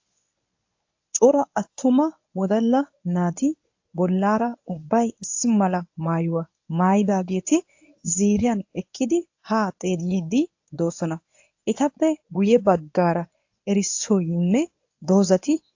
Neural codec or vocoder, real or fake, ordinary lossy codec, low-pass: codec, 24 kHz, 0.9 kbps, WavTokenizer, medium speech release version 1; fake; AAC, 48 kbps; 7.2 kHz